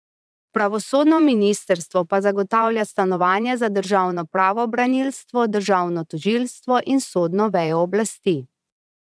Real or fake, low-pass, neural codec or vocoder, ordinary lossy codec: fake; none; vocoder, 22.05 kHz, 80 mel bands, Vocos; none